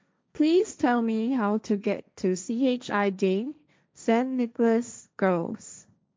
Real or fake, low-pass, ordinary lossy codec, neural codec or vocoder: fake; none; none; codec, 16 kHz, 1.1 kbps, Voila-Tokenizer